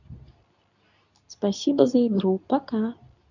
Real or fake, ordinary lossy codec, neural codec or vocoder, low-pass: fake; none; codec, 24 kHz, 0.9 kbps, WavTokenizer, medium speech release version 2; 7.2 kHz